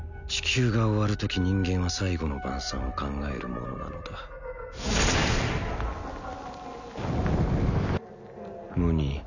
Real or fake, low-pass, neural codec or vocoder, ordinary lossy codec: real; 7.2 kHz; none; none